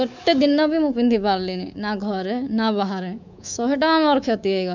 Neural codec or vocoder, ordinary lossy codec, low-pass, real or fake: codec, 24 kHz, 3.1 kbps, DualCodec; none; 7.2 kHz; fake